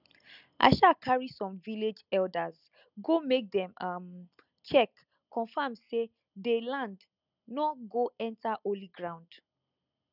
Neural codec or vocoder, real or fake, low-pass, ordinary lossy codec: none; real; 5.4 kHz; none